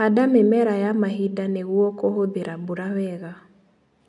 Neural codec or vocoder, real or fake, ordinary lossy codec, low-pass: none; real; none; 10.8 kHz